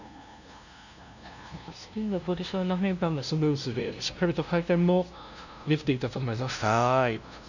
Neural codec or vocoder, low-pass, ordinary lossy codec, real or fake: codec, 16 kHz, 0.5 kbps, FunCodec, trained on LibriTTS, 25 frames a second; 7.2 kHz; none; fake